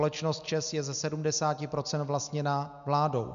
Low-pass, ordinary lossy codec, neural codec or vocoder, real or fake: 7.2 kHz; MP3, 64 kbps; none; real